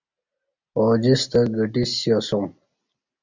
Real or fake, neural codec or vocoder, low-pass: real; none; 7.2 kHz